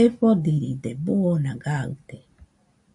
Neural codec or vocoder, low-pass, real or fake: none; 10.8 kHz; real